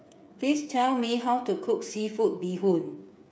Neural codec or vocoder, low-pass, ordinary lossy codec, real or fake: codec, 16 kHz, 8 kbps, FreqCodec, smaller model; none; none; fake